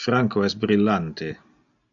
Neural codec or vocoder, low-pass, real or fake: none; 7.2 kHz; real